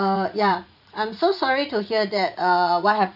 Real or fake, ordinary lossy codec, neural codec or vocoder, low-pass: fake; none; vocoder, 44.1 kHz, 80 mel bands, Vocos; 5.4 kHz